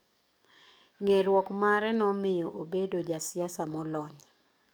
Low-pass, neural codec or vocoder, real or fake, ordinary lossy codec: none; codec, 44.1 kHz, 7.8 kbps, DAC; fake; none